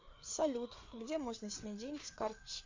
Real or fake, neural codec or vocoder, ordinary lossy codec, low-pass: fake; codec, 16 kHz, 4 kbps, FreqCodec, larger model; MP3, 64 kbps; 7.2 kHz